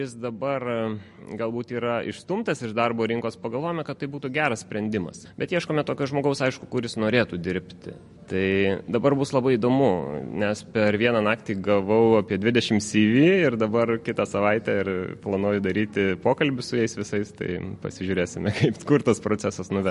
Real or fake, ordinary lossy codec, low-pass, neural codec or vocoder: real; MP3, 48 kbps; 14.4 kHz; none